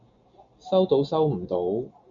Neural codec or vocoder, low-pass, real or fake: none; 7.2 kHz; real